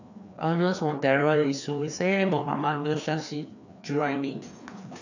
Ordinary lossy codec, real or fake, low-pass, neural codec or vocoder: none; fake; 7.2 kHz; codec, 16 kHz, 2 kbps, FreqCodec, larger model